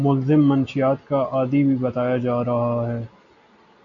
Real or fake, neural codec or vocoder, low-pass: real; none; 7.2 kHz